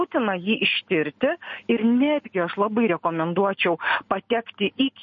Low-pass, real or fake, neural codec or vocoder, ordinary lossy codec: 7.2 kHz; real; none; MP3, 32 kbps